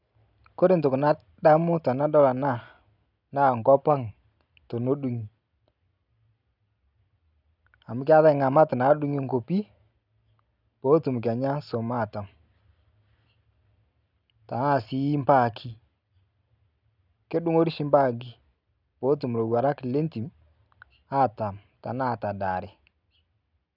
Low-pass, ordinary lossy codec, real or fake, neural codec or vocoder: 5.4 kHz; none; real; none